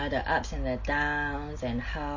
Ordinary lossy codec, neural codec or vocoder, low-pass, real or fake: MP3, 32 kbps; none; 7.2 kHz; real